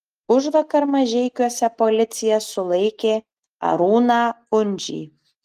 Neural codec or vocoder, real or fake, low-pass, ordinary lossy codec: none; real; 14.4 kHz; Opus, 24 kbps